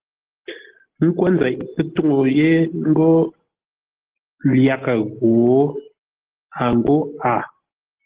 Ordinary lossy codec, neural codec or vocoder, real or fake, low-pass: Opus, 16 kbps; none; real; 3.6 kHz